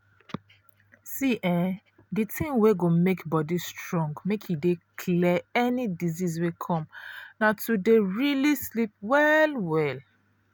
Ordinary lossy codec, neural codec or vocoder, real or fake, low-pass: none; none; real; none